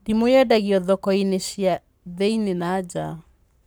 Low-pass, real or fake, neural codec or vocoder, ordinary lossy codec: none; fake; codec, 44.1 kHz, 7.8 kbps, Pupu-Codec; none